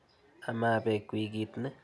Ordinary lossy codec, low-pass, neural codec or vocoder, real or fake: none; none; none; real